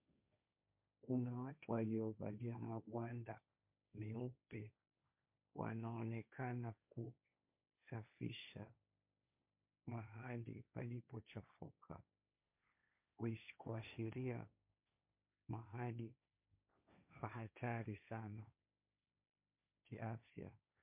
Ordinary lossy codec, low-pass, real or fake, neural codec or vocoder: MP3, 32 kbps; 3.6 kHz; fake; codec, 16 kHz, 1.1 kbps, Voila-Tokenizer